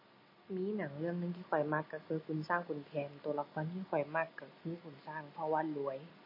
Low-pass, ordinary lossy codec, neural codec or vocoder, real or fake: 5.4 kHz; MP3, 24 kbps; none; real